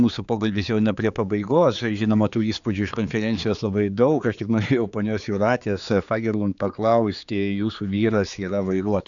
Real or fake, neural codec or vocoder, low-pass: fake; codec, 16 kHz, 4 kbps, X-Codec, HuBERT features, trained on general audio; 7.2 kHz